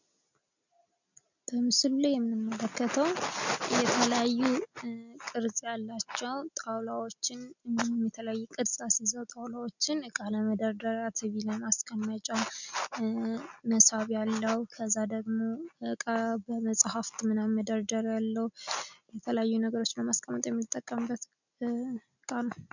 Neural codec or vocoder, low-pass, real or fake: none; 7.2 kHz; real